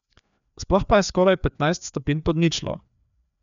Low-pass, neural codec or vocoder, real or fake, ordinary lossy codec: 7.2 kHz; codec, 16 kHz, 2 kbps, FreqCodec, larger model; fake; none